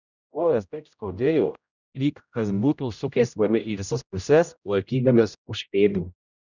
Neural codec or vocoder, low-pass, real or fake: codec, 16 kHz, 0.5 kbps, X-Codec, HuBERT features, trained on general audio; 7.2 kHz; fake